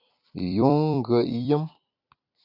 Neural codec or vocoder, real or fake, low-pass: vocoder, 44.1 kHz, 128 mel bands every 256 samples, BigVGAN v2; fake; 5.4 kHz